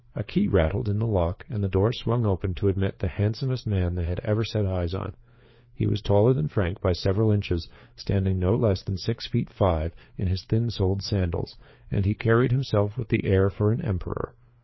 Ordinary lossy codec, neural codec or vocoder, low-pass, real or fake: MP3, 24 kbps; codec, 16 kHz, 4 kbps, FreqCodec, larger model; 7.2 kHz; fake